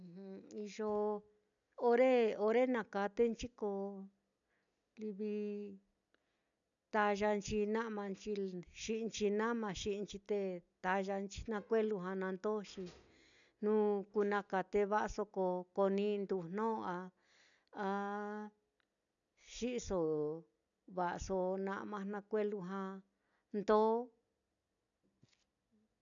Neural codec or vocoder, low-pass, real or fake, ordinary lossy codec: none; 7.2 kHz; real; none